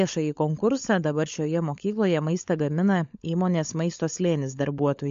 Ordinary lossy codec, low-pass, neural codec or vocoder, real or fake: MP3, 48 kbps; 7.2 kHz; codec, 16 kHz, 16 kbps, FunCodec, trained on LibriTTS, 50 frames a second; fake